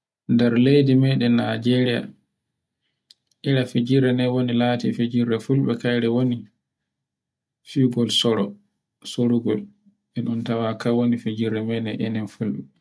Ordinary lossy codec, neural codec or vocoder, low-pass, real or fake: none; none; none; real